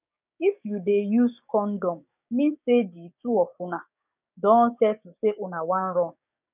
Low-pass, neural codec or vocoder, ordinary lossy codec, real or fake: 3.6 kHz; codec, 16 kHz, 6 kbps, DAC; none; fake